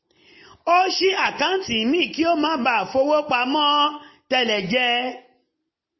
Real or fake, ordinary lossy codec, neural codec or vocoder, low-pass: real; MP3, 24 kbps; none; 7.2 kHz